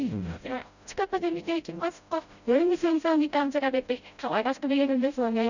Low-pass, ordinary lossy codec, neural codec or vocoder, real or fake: 7.2 kHz; none; codec, 16 kHz, 0.5 kbps, FreqCodec, smaller model; fake